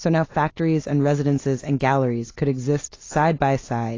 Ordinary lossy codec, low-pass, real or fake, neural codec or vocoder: AAC, 32 kbps; 7.2 kHz; real; none